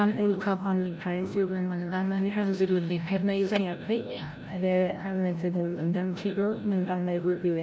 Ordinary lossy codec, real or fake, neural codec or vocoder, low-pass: none; fake; codec, 16 kHz, 0.5 kbps, FreqCodec, larger model; none